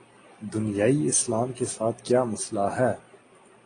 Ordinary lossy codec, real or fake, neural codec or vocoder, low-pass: AAC, 32 kbps; real; none; 9.9 kHz